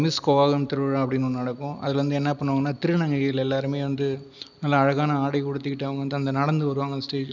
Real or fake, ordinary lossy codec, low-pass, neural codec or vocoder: real; none; 7.2 kHz; none